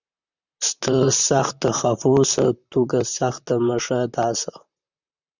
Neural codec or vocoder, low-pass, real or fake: vocoder, 44.1 kHz, 128 mel bands, Pupu-Vocoder; 7.2 kHz; fake